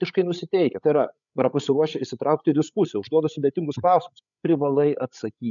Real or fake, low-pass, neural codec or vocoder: fake; 7.2 kHz; codec, 16 kHz, 8 kbps, FreqCodec, larger model